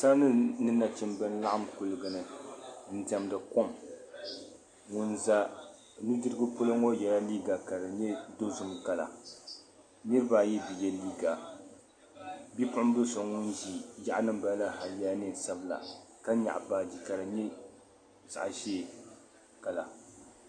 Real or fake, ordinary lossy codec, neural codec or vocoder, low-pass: real; MP3, 48 kbps; none; 9.9 kHz